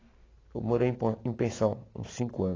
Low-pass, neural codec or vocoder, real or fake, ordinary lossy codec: 7.2 kHz; none; real; AAC, 32 kbps